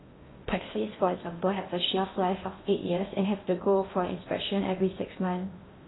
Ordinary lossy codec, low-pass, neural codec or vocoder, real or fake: AAC, 16 kbps; 7.2 kHz; codec, 16 kHz in and 24 kHz out, 0.6 kbps, FocalCodec, streaming, 2048 codes; fake